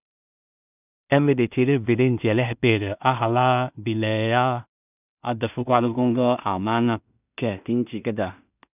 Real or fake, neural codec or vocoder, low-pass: fake; codec, 16 kHz in and 24 kHz out, 0.4 kbps, LongCat-Audio-Codec, two codebook decoder; 3.6 kHz